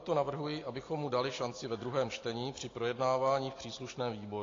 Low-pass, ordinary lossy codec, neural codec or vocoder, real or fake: 7.2 kHz; AAC, 32 kbps; none; real